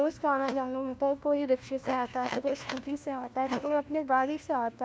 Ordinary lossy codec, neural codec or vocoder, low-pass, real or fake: none; codec, 16 kHz, 1 kbps, FunCodec, trained on LibriTTS, 50 frames a second; none; fake